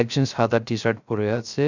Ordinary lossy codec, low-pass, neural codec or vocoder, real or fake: none; 7.2 kHz; codec, 16 kHz, 0.3 kbps, FocalCodec; fake